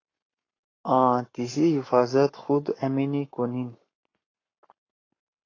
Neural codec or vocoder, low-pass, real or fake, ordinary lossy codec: codec, 44.1 kHz, 7.8 kbps, Pupu-Codec; 7.2 kHz; fake; AAC, 32 kbps